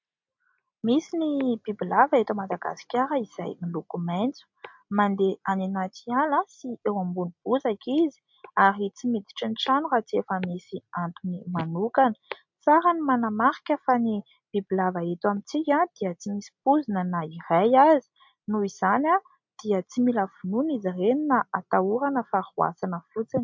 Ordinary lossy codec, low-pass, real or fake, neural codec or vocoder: MP3, 48 kbps; 7.2 kHz; real; none